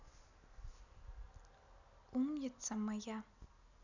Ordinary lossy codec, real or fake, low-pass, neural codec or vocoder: none; real; 7.2 kHz; none